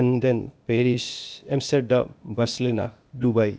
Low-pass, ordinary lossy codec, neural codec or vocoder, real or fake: none; none; codec, 16 kHz, 0.8 kbps, ZipCodec; fake